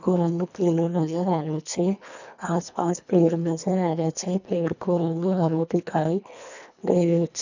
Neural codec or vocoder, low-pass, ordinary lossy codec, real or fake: codec, 24 kHz, 1.5 kbps, HILCodec; 7.2 kHz; none; fake